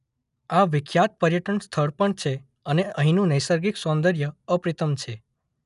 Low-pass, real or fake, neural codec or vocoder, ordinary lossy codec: 10.8 kHz; real; none; none